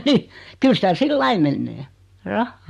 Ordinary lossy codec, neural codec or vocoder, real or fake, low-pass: MP3, 64 kbps; none; real; 14.4 kHz